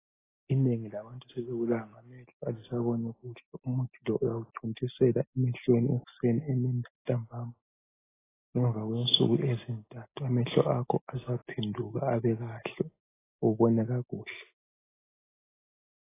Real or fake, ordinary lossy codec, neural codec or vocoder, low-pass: real; AAC, 16 kbps; none; 3.6 kHz